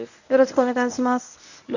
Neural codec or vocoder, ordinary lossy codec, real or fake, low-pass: codec, 24 kHz, 0.9 kbps, WavTokenizer, medium speech release version 1; none; fake; 7.2 kHz